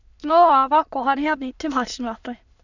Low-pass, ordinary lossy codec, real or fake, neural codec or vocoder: 7.2 kHz; none; fake; autoencoder, 22.05 kHz, a latent of 192 numbers a frame, VITS, trained on many speakers